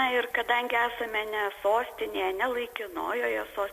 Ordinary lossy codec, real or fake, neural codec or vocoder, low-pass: MP3, 64 kbps; real; none; 14.4 kHz